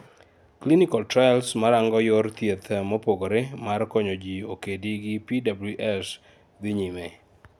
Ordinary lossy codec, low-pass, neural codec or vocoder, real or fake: none; 19.8 kHz; none; real